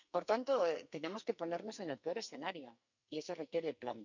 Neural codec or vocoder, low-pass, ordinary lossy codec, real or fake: codec, 44.1 kHz, 2.6 kbps, SNAC; 7.2 kHz; none; fake